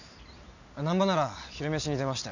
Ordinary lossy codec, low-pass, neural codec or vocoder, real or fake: none; 7.2 kHz; none; real